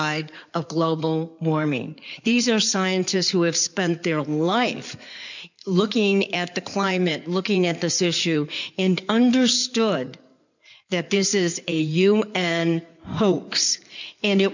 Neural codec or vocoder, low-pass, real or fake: codec, 16 kHz in and 24 kHz out, 2.2 kbps, FireRedTTS-2 codec; 7.2 kHz; fake